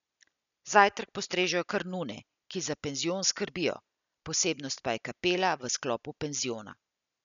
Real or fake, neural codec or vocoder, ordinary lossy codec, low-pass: real; none; none; 7.2 kHz